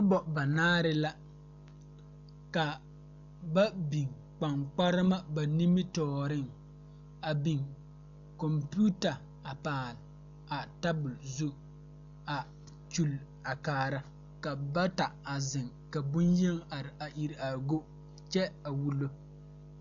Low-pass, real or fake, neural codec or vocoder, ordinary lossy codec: 7.2 kHz; real; none; MP3, 96 kbps